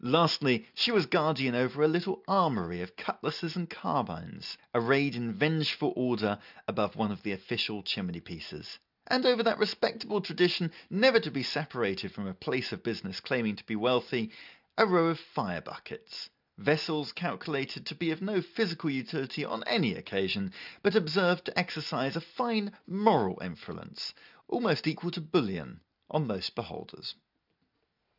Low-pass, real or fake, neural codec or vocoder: 5.4 kHz; real; none